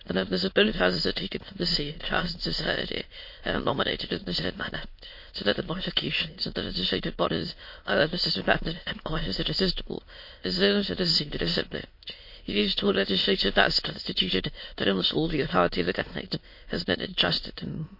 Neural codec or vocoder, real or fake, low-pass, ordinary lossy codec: autoencoder, 22.05 kHz, a latent of 192 numbers a frame, VITS, trained on many speakers; fake; 5.4 kHz; MP3, 32 kbps